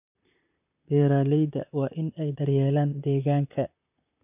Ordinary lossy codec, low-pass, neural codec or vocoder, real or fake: none; 3.6 kHz; vocoder, 44.1 kHz, 128 mel bands, Pupu-Vocoder; fake